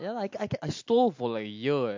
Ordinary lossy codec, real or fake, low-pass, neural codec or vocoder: MP3, 48 kbps; real; 7.2 kHz; none